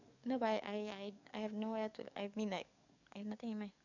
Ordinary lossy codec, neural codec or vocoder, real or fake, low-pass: none; codec, 44.1 kHz, 7.8 kbps, DAC; fake; 7.2 kHz